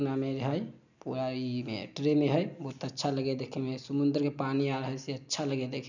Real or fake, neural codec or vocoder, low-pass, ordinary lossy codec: real; none; 7.2 kHz; none